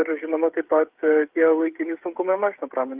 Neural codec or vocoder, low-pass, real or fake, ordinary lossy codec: codec, 16 kHz, 8 kbps, FreqCodec, smaller model; 3.6 kHz; fake; Opus, 32 kbps